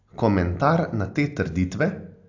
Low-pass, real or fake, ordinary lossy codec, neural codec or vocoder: 7.2 kHz; real; AAC, 48 kbps; none